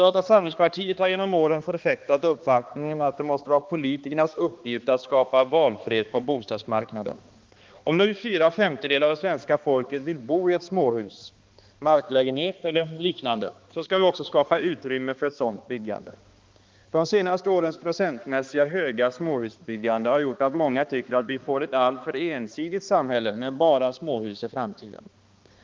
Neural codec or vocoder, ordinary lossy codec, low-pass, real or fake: codec, 16 kHz, 2 kbps, X-Codec, HuBERT features, trained on balanced general audio; Opus, 32 kbps; 7.2 kHz; fake